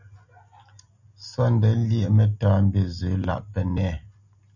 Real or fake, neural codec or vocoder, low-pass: real; none; 7.2 kHz